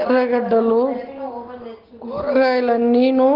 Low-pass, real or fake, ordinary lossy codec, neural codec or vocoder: 5.4 kHz; real; Opus, 16 kbps; none